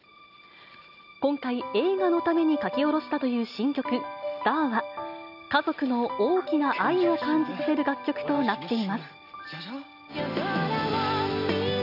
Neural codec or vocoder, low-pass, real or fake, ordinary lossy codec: none; 5.4 kHz; real; none